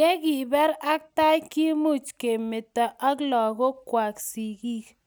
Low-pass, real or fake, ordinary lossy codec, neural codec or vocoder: none; real; none; none